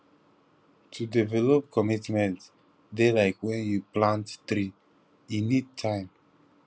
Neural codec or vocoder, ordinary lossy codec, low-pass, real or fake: none; none; none; real